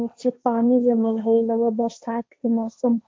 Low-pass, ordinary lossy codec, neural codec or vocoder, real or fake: none; none; codec, 16 kHz, 1.1 kbps, Voila-Tokenizer; fake